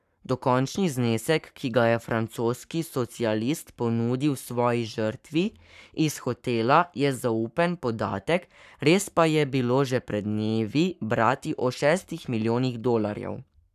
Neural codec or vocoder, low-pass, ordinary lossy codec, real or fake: codec, 44.1 kHz, 7.8 kbps, Pupu-Codec; 14.4 kHz; none; fake